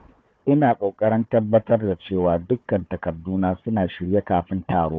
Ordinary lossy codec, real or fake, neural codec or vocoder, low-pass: none; fake; codec, 16 kHz, 4 kbps, FunCodec, trained on Chinese and English, 50 frames a second; none